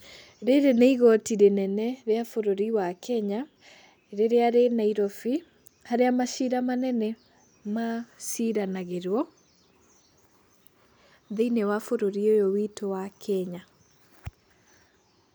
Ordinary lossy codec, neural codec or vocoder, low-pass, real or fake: none; none; none; real